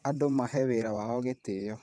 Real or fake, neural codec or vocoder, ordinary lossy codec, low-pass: fake; vocoder, 22.05 kHz, 80 mel bands, WaveNeXt; none; none